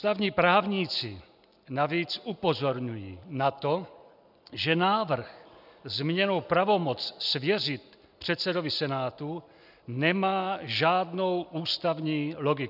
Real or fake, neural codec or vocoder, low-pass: real; none; 5.4 kHz